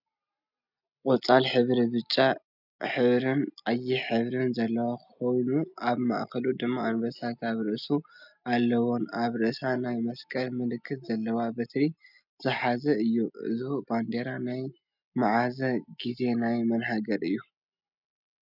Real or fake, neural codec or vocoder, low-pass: real; none; 5.4 kHz